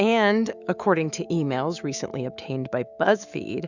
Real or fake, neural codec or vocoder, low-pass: fake; autoencoder, 48 kHz, 128 numbers a frame, DAC-VAE, trained on Japanese speech; 7.2 kHz